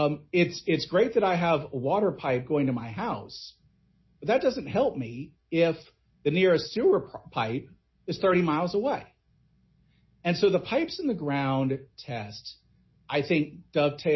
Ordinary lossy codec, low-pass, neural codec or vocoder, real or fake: MP3, 24 kbps; 7.2 kHz; none; real